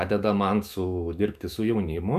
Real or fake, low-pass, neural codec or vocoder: fake; 14.4 kHz; autoencoder, 48 kHz, 128 numbers a frame, DAC-VAE, trained on Japanese speech